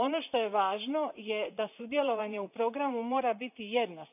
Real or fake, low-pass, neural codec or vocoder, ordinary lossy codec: fake; 3.6 kHz; vocoder, 44.1 kHz, 128 mel bands, Pupu-Vocoder; none